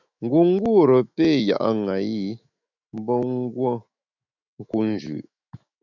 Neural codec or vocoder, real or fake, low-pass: autoencoder, 48 kHz, 128 numbers a frame, DAC-VAE, trained on Japanese speech; fake; 7.2 kHz